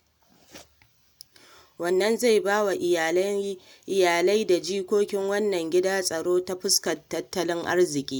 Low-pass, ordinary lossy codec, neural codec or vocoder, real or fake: none; none; none; real